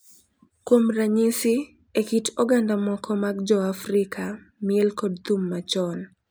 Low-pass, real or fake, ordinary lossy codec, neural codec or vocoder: none; real; none; none